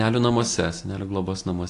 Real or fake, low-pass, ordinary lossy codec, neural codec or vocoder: real; 10.8 kHz; AAC, 48 kbps; none